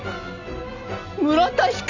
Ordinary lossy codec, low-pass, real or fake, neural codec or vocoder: none; 7.2 kHz; real; none